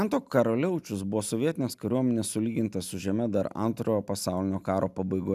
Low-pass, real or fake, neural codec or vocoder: 14.4 kHz; real; none